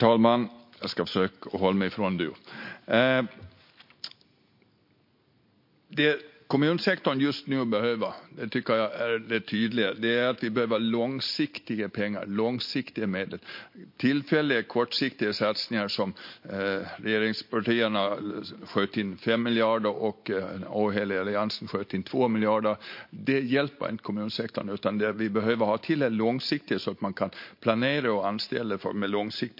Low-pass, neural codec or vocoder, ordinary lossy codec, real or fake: 5.4 kHz; none; MP3, 32 kbps; real